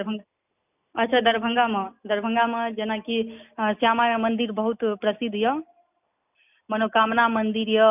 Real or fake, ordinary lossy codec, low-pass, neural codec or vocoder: real; none; 3.6 kHz; none